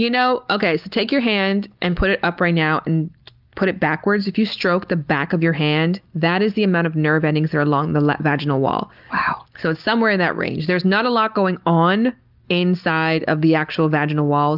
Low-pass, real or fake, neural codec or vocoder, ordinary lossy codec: 5.4 kHz; real; none; Opus, 32 kbps